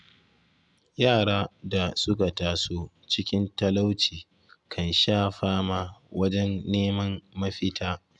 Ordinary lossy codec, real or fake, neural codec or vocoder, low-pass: none; real; none; 10.8 kHz